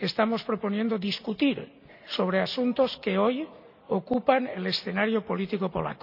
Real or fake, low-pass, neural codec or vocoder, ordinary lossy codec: real; 5.4 kHz; none; none